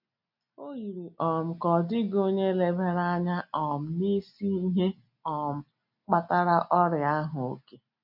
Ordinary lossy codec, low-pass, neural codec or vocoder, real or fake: none; 5.4 kHz; none; real